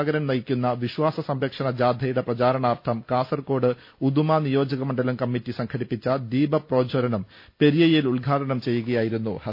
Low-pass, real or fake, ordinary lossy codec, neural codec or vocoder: 5.4 kHz; real; MP3, 32 kbps; none